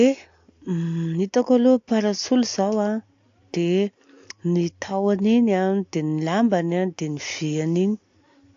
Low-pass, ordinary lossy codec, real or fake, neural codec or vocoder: 7.2 kHz; AAC, 48 kbps; real; none